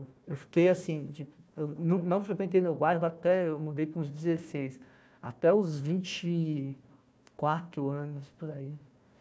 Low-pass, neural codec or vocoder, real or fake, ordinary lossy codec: none; codec, 16 kHz, 1 kbps, FunCodec, trained on Chinese and English, 50 frames a second; fake; none